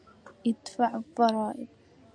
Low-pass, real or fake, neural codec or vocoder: 9.9 kHz; real; none